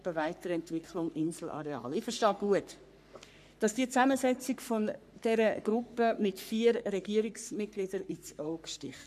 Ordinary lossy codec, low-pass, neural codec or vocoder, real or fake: none; 14.4 kHz; codec, 44.1 kHz, 3.4 kbps, Pupu-Codec; fake